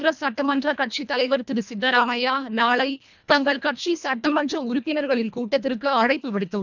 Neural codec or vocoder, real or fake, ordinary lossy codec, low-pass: codec, 24 kHz, 1.5 kbps, HILCodec; fake; none; 7.2 kHz